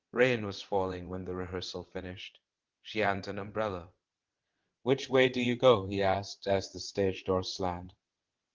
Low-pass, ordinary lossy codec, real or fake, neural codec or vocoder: 7.2 kHz; Opus, 16 kbps; fake; vocoder, 22.05 kHz, 80 mel bands, WaveNeXt